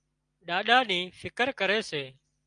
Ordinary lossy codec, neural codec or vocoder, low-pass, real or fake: Opus, 32 kbps; none; 9.9 kHz; real